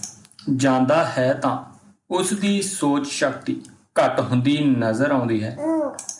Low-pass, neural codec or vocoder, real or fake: 10.8 kHz; none; real